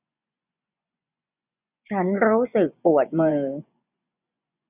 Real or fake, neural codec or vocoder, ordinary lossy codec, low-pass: fake; vocoder, 24 kHz, 100 mel bands, Vocos; none; 3.6 kHz